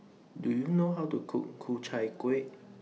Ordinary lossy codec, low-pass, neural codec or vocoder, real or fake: none; none; none; real